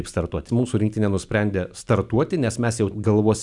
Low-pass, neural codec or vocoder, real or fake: 10.8 kHz; none; real